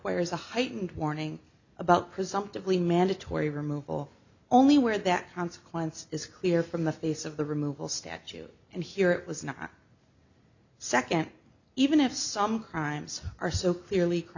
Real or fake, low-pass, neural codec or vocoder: real; 7.2 kHz; none